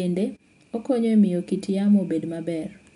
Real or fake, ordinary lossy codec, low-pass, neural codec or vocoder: real; MP3, 64 kbps; 10.8 kHz; none